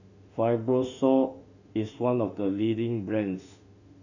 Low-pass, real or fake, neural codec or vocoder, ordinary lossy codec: 7.2 kHz; fake; autoencoder, 48 kHz, 32 numbers a frame, DAC-VAE, trained on Japanese speech; none